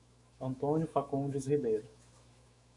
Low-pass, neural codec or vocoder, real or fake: 10.8 kHz; autoencoder, 48 kHz, 128 numbers a frame, DAC-VAE, trained on Japanese speech; fake